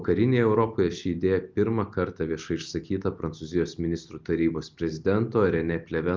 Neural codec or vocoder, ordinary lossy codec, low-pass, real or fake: none; Opus, 32 kbps; 7.2 kHz; real